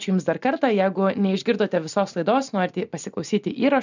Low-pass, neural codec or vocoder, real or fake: 7.2 kHz; none; real